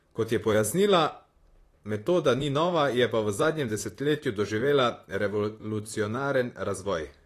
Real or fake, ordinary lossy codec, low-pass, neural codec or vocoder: fake; AAC, 48 kbps; 14.4 kHz; vocoder, 44.1 kHz, 128 mel bands, Pupu-Vocoder